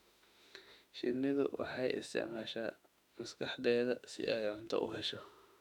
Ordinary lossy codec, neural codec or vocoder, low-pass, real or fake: none; autoencoder, 48 kHz, 32 numbers a frame, DAC-VAE, trained on Japanese speech; 19.8 kHz; fake